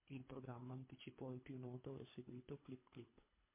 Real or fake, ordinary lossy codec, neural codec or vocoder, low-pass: fake; MP3, 24 kbps; codec, 24 kHz, 3 kbps, HILCodec; 3.6 kHz